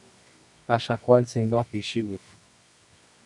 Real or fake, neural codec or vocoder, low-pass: fake; codec, 24 kHz, 0.9 kbps, WavTokenizer, medium music audio release; 10.8 kHz